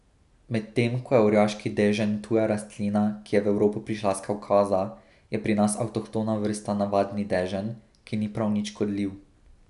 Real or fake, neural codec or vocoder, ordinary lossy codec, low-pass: real; none; none; 10.8 kHz